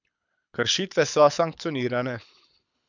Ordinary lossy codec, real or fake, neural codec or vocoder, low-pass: none; fake; codec, 24 kHz, 6 kbps, HILCodec; 7.2 kHz